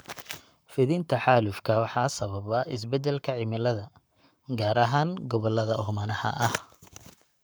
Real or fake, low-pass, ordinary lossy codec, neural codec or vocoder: fake; none; none; codec, 44.1 kHz, 7.8 kbps, Pupu-Codec